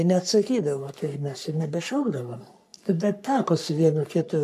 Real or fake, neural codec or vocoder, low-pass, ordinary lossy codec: fake; codec, 44.1 kHz, 3.4 kbps, Pupu-Codec; 14.4 kHz; AAC, 96 kbps